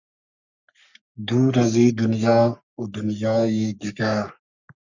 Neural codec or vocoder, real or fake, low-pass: codec, 44.1 kHz, 3.4 kbps, Pupu-Codec; fake; 7.2 kHz